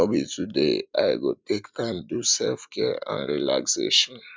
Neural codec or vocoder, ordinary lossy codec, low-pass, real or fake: none; none; none; real